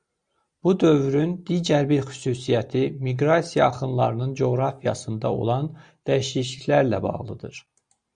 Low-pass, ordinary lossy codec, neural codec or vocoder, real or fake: 9.9 kHz; Opus, 64 kbps; none; real